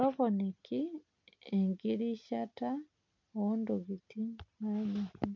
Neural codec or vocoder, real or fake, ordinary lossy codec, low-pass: none; real; MP3, 48 kbps; 7.2 kHz